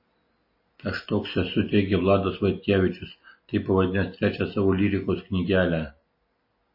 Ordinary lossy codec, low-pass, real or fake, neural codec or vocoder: MP3, 24 kbps; 5.4 kHz; real; none